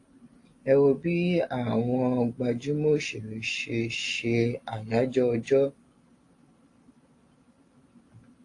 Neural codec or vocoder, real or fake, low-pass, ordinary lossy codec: none; real; 10.8 kHz; AAC, 48 kbps